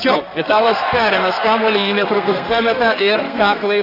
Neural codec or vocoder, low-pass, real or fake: codec, 32 kHz, 1.9 kbps, SNAC; 5.4 kHz; fake